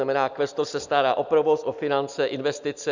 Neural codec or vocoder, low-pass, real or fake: none; 7.2 kHz; real